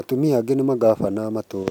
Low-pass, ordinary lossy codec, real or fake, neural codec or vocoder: 19.8 kHz; none; real; none